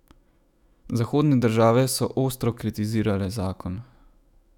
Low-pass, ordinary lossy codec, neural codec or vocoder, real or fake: 19.8 kHz; none; autoencoder, 48 kHz, 128 numbers a frame, DAC-VAE, trained on Japanese speech; fake